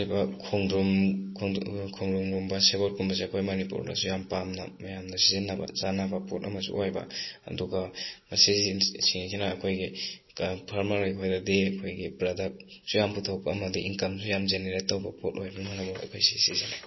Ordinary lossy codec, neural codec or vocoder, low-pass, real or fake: MP3, 24 kbps; none; 7.2 kHz; real